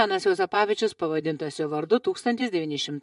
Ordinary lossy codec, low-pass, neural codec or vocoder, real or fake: MP3, 64 kbps; 10.8 kHz; vocoder, 24 kHz, 100 mel bands, Vocos; fake